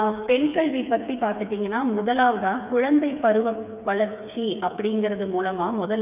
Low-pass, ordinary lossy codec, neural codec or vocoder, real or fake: 3.6 kHz; none; codec, 16 kHz, 4 kbps, FreqCodec, smaller model; fake